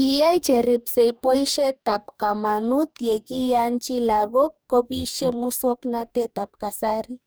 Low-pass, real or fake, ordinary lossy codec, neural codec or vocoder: none; fake; none; codec, 44.1 kHz, 2.6 kbps, DAC